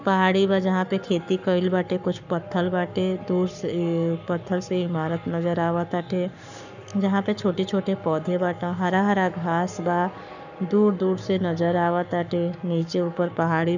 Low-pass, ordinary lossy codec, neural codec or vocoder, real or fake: 7.2 kHz; none; codec, 44.1 kHz, 7.8 kbps, Pupu-Codec; fake